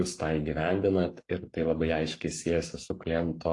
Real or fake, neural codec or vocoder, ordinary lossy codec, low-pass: fake; codec, 44.1 kHz, 7.8 kbps, Pupu-Codec; AAC, 48 kbps; 10.8 kHz